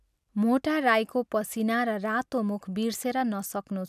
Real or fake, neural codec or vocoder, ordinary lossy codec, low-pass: real; none; none; 14.4 kHz